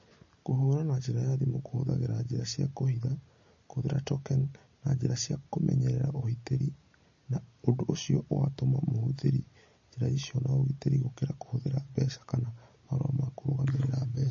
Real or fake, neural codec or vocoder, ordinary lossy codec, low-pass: real; none; MP3, 32 kbps; 7.2 kHz